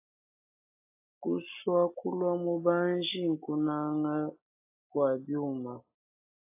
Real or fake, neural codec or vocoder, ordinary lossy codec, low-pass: real; none; AAC, 24 kbps; 3.6 kHz